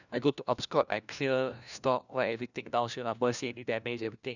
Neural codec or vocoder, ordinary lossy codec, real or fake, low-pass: codec, 16 kHz, 1 kbps, FunCodec, trained on LibriTTS, 50 frames a second; none; fake; 7.2 kHz